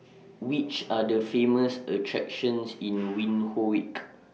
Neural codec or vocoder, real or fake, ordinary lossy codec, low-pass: none; real; none; none